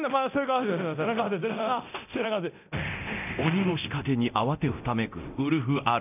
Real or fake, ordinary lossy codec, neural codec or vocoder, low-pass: fake; none; codec, 24 kHz, 0.9 kbps, DualCodec; 3.6 kHz